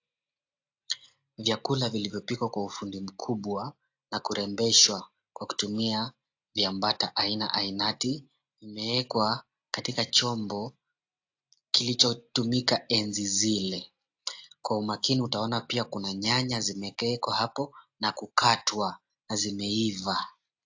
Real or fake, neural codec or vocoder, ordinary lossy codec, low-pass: real; none; AAC, 48 kbps; 7.2 kHz